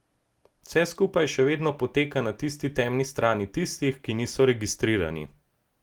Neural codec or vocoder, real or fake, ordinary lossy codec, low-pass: none; real; Opus, 24 kbps; 19.8 kHz